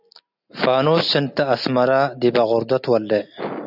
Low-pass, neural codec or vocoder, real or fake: 5.4 kHz; none; real